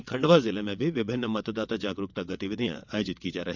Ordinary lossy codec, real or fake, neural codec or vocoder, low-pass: none; fake; vocoder, 22.05 kHz, 80 mel bands, WaveNeXt; 7.2 kHz